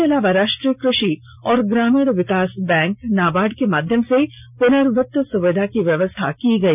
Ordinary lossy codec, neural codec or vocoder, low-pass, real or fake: none; none; 3.6 kHz; real